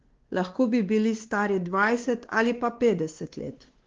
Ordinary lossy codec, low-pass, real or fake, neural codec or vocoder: Opus, 16 kbps; 7.2 kHz; fake; codec, 16 kHz, 2 kbps, X-Codec, WavLM features, trained on Multilingual LibriSpeech